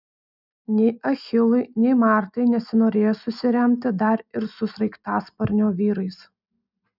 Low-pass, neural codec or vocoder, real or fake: 5.4 kHz; none; real